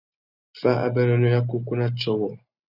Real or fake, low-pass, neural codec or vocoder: real; 5.4 kHz; none